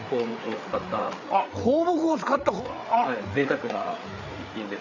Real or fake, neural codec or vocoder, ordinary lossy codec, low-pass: fake; codec, 16 kHz, 8 kbps, FreqCodec, larger model; none; 7.2 kHz